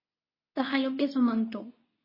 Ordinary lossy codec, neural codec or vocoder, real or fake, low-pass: MP3, 24 kbps; codec, 24 kHz, 0.9 kbps, WavTokenizer, medium speech release version 2; fake; 5.4 kHz